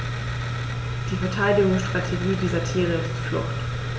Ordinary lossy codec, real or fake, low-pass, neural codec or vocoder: none; real; none; none